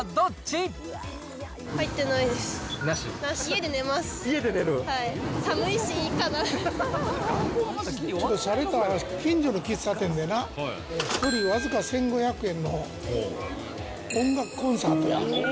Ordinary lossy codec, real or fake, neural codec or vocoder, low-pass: none; real; none; none